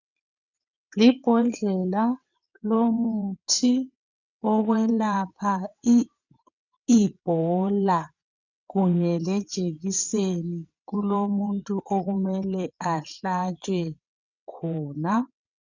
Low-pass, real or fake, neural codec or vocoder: 7.2 kHz; fake; vocoder, 22.05 kHz, 80 mel bands, WaveNeXt